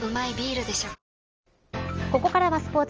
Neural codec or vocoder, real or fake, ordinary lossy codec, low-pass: none; real; Opus, 24 kbps; 7.2 kHz